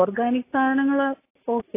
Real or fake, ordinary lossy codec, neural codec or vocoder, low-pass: real; AAC, 24 kbps; none; 3.6 kHz